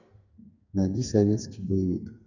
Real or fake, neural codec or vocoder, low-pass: fake; codec, 44.1 kHz, 2.6 kbps, SNAC; 7.2 kHz